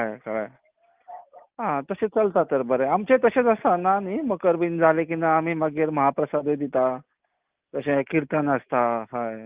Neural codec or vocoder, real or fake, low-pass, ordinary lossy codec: none; real; 3.6 kHz; Opus, 32 kbps